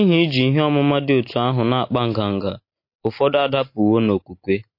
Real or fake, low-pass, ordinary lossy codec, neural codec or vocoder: real; 5.4 kHz; MP3, 32 kbps; none